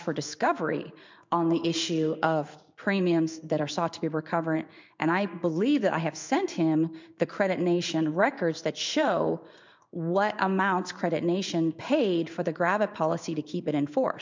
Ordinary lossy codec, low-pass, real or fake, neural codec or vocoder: MP3, 64 kbps; 7.2 kHz; fake; codec, 16 kHz in and 24 kHz out, 1 kbps, XY-Tokenizer